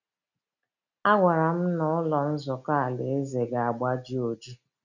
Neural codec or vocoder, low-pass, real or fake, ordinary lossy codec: none; 7.2 kHz; real; none